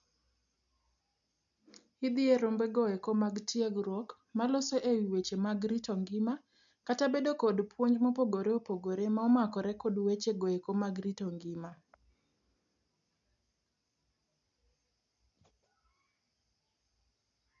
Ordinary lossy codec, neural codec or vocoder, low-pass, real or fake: none; none; 7.2 kHz; real